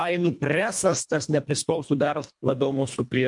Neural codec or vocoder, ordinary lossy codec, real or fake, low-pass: codec, 24 kHz, 1.5 kbps, HILCodec; MP3, 64 kbps; fake; 10.8 kHz